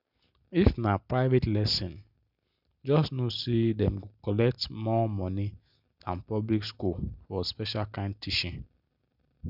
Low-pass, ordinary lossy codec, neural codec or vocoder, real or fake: 5.4 kHz; none; none; real